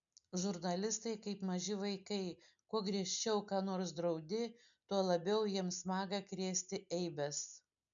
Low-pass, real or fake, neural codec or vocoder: 7.2 kHz; real; none